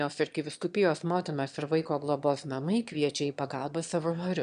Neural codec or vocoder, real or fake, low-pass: autoencoder, 22.05 kHz, a latent of 192 numbers a frame, VITS, trained on one speaker; fake; 9.9 kHz